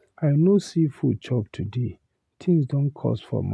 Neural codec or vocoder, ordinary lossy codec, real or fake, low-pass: none; none; real; none